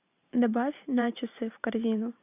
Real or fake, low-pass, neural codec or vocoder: fake; 3.6 kHz; vocoder, 44.1 kHz, 128 mel bands every 512 samples, BigVGAN v2